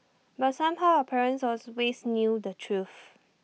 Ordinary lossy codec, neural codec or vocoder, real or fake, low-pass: none; none; real; none